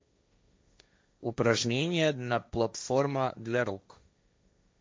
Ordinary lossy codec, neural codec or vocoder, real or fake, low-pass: none; codec, 16 kHz, 1.1 kbps, Voila-Tokenizer; fake; none